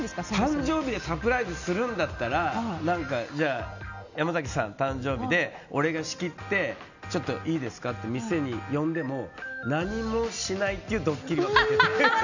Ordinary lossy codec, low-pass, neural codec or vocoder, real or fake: none; 7.2 kHz; none; real